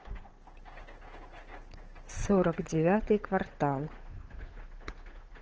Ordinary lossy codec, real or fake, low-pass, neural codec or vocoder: Opus, 16 kbps; fake; 7.2 kHz; codec, 16 kHz, 8 kbps, FunCodec, trained on Chinese and English, 25 frames a second